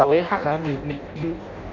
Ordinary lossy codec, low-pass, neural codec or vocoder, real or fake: none; 7.2 kHz; codec, 16 kHz in and 24 kHz out, 0.6 kbps, FireRedTTS-2 codec; fake